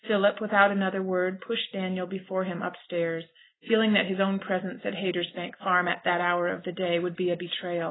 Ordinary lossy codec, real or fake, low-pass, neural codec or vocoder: AAC, 16 kbps; real; 7.2 kHz; none